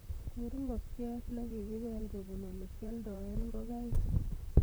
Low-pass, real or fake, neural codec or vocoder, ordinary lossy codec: none; fake; vocoder, 44.1 kHz, 128 mel bands, Pupu-Vocoder; none